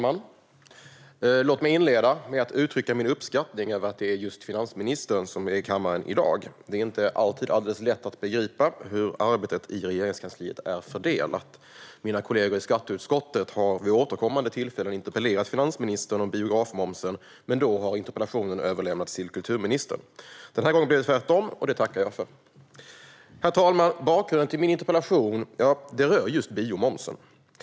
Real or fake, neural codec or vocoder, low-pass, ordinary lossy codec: real; none; none; none